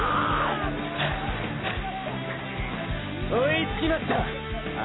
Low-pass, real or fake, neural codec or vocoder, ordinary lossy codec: 7.2 kHz; real; none; AAC, 16 kbps